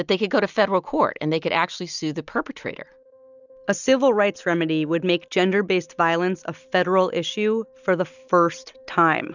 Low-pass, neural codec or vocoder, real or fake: 7.2 kHz; none; real